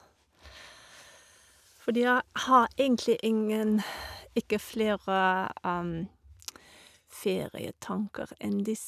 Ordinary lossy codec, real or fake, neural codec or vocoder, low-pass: none; real; none; 14.4 kHz